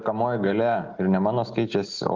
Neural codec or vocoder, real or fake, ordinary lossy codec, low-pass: none; real; Opus, 24 kbps; 7.2 kHz